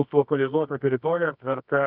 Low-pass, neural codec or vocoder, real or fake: 5.4 kHz; codec, 24 kHz, 0.9 kbps, WavTokenizer, medium music audio release; fake